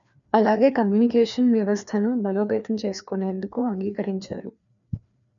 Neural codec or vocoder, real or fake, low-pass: codec, 16 kHz, 2 kbps, FreqCodec, larger model; fake; 7.2 kHz